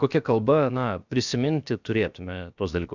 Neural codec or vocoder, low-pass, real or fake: codec, 16 kHz, about 1 kbps, DyCAST, with the encoder's durations; 7.2 kHz; fake